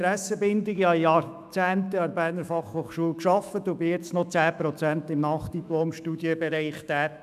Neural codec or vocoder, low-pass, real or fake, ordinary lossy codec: autoencoder, 48 kHz, 128 numbers a frame, DAC-VAE, trained on Japanese speech; 14.4 kHz; fake; none